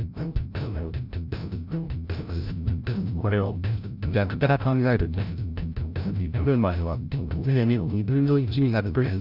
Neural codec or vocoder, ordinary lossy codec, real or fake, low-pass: codec, 16 kHz, 0.5 kbps, FreqCodec, larger model; none; fake; 5.4 kHz